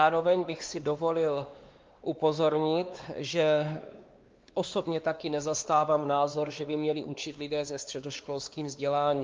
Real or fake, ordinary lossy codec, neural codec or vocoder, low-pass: fake; Opus, 32 kbps; codec, 16 kHz, 2 kbps, X-Codec, WavLM features, trained on Multilingual LibriSpeech; 7.2 kHz